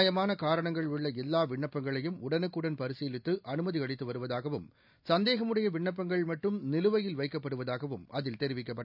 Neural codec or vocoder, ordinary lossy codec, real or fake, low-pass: none; none; real; 5.4 kHz